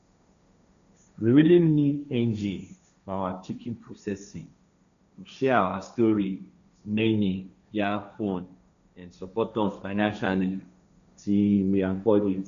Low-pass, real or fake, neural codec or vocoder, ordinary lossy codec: 7.2 kHz; fake; codec, 16 kHz, 1.1 kbps, Voila-Tokenizer; none